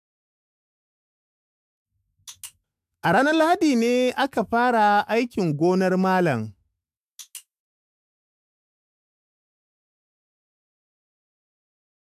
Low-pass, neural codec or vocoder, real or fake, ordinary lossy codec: 14.4 kHz; autoencoder, 48 kHz, 128 numbers a frame, DAC-VAE, trained on Japanese speech; fake; AAC, 96 kbps